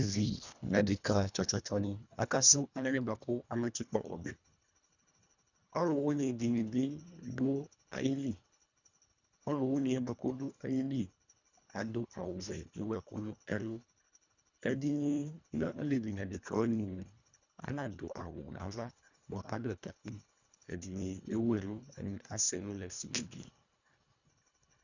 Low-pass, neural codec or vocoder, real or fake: 7.2 kHz; codec, 24 kHz, 1.5 kbps, HILCodec; fake